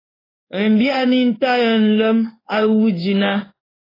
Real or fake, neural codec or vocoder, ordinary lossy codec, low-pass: fake; codec, 16 kHz in and 24 kHz out, 1 kbps, XY-Tokenizer; AAC, 24 kbps; 5.4 kHz